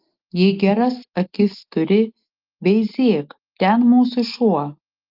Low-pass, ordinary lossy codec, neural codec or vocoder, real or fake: 5.4 kHz; Opus, 24 kbps; none; real